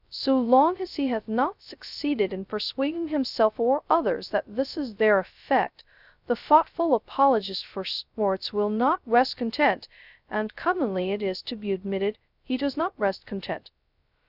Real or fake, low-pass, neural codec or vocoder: fake; 5.4 kHz; codec, 16 kHz, 0.2 kbps, FocalCodec